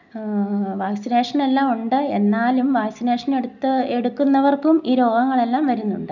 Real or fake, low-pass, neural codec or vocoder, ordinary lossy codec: real; 7.2 kHz; none; none